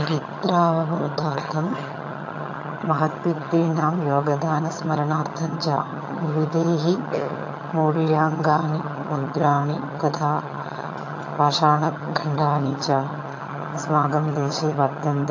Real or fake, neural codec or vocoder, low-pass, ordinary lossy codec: fake; vocoder, 22.05 kHz, 80 mel bands, HiFi-GAN; 7.2 kHz; AAC, 48 kbps